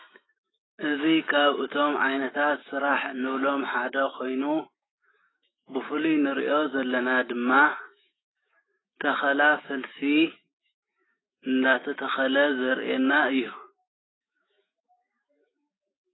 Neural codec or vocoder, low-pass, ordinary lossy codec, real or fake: autoencoder, 48 kHz, 128 numbers a frame, DAC-VAE, trained on Japanese speech; 7.2 kHz; AAC, 16 kbps; fake